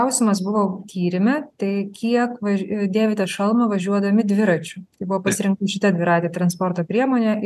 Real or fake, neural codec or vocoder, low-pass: real; none; 14.4 kHz